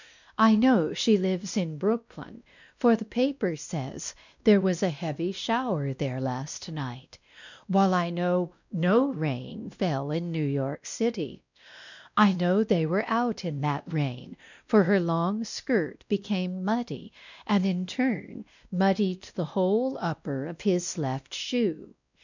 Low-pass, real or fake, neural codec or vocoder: 7.2 kHz; fake; codec, 16 kHz, 1 kbps, X-Codec, WavLM features, trained on Multilingual LibriSpeech